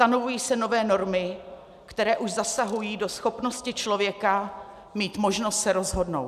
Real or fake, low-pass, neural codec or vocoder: real; 14.4 kHz; none